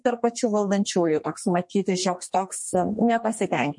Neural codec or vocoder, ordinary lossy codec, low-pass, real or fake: codec, 32 kHz, 1.9 kbps, SNAC; MP3, 48 kbps; 10.8 kHz; fake